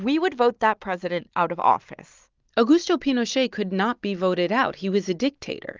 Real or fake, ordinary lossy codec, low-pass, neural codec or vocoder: real; Opus, 32 kbps; 7.2 kHz; none